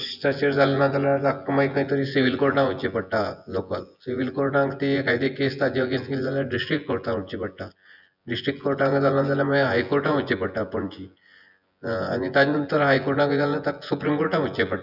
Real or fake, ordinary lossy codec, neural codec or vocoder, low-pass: fake; none; vocoder, 24 kHz, 100 mel bands, Vocos; 5.4 kHz